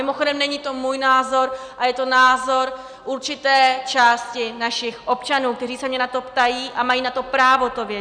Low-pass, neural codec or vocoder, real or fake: 9.9 kHz; none; real